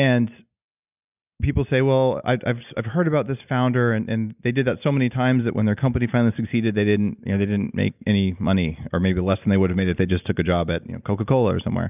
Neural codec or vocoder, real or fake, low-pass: none; real; 3.6 kHz